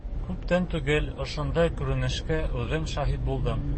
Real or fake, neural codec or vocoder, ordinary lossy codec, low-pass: fake; codec, 44.1 kHz, 7.8 kbps, DAC; MP3, 32 kbps; 9.9 kHz